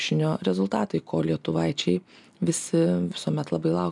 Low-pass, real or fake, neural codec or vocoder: 10.8 kHz; real; none